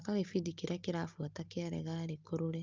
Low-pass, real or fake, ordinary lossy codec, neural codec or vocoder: 7.2 kHz; real; Opus, 32 kbps; none